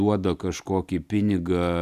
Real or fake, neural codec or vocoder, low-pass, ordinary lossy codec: real; none; 14.4 kHz; Opus, 64 kbps